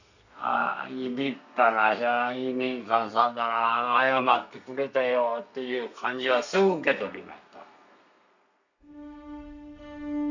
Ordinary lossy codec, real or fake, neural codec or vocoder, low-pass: none; fake; codec, 32 kHz, 1.9 kbps, SNAC; 7.2 kHz